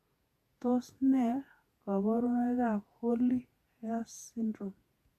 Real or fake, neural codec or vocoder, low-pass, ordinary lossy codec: fake; vocoder, 48 kHz, 128 mel bands, Vocos; 14.4 kHz; AAC, 64 kbps